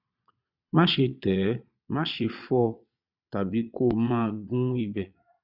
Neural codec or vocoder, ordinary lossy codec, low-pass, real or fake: vocoder, 22.05 kHz, 80 mel bands, Vocos; none; 5.4 kHz; fake